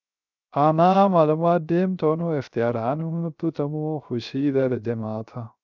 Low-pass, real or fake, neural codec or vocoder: 7.2 kHz; fake; codec, 16 kHz, 0.3 kbps, FocalCodec